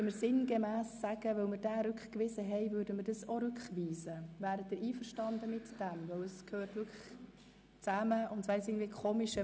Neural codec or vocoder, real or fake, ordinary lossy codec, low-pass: none; real; none; none